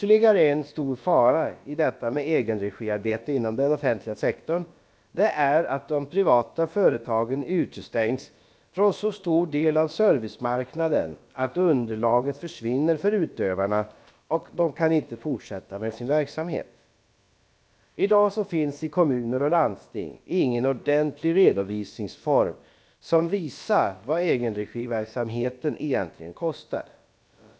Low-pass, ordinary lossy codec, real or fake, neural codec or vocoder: none; none; fake; codec, 16 kHz, about 1 kbps, DyCAST, with the encoder's durations